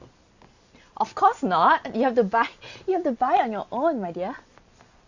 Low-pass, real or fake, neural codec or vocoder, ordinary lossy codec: 7.2 kHz; real; none; Opus, 64 kbps